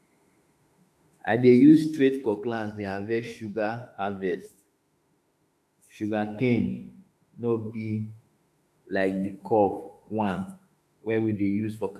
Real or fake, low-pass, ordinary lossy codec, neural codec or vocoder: fake; 14.4 kHz; AAC, 96 kbps; autoencoder, 48 kHz, 32 numbers a frame, DAC-VAE, trained on Japanese speech